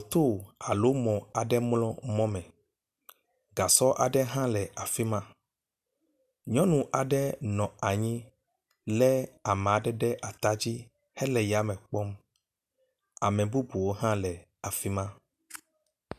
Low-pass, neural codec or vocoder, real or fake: 14.4 kHz; none; real